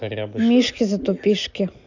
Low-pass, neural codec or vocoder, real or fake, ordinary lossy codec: 7.2 kHz; none; real; none